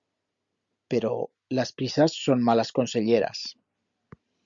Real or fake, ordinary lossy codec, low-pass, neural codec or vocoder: real; Opus, 64 kbps; 7.2 kHz; none